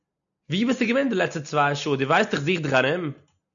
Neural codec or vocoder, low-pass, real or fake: none; 7.2 kHz; real